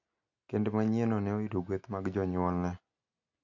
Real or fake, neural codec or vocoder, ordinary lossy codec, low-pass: real; none; MP3, 48 kbps; 7.2 kHz